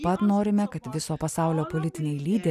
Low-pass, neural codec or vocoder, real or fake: 14.4 kHz; none; real